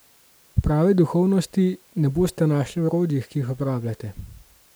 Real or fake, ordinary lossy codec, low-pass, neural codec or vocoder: real; none; none; none